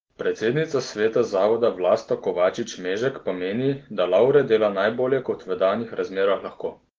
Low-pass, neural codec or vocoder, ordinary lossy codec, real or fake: 7.2 kHz; none; Opus, 16 kbps; real